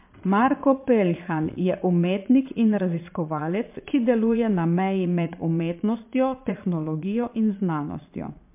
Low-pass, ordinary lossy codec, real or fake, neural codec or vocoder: 3.6 kHz; MP3, 32 kbps; fake; vocoder, 22.05 kHz, 80 mel bands, Vocos